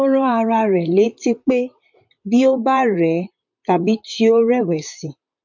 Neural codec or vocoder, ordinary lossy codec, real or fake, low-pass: vocoder, 44.1 kHz, 128 mel bands, Pupu-Vocoder; MP3, 48 kbps; fake; 7.2 kHz